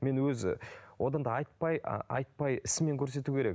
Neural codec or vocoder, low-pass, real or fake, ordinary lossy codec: none; none; real; none